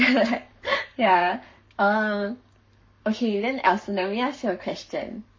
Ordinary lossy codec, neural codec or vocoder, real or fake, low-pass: MP3, 32 kbps; codec, 44.1 kHz, 7.8 kbps, Pupu-Codec; fake; 7.2 kHz